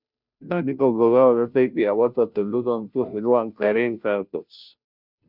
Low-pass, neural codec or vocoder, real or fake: 5.4 kHz; codec, 16 kHz, 0.5 kbps, FunCodec, trained on Chinese and English, 25 frames a second; fake